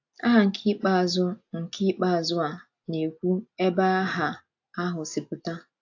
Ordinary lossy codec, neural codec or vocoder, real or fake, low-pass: AAC, 48 kbps; none; real; 7.2 kHz